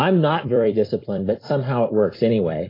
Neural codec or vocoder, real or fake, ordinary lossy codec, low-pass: vocoder, 44.1 kHz, 128 mel bands every 256 samples, BigVGAN v2; fake; AAC, 24 kbps; 5.4 kHz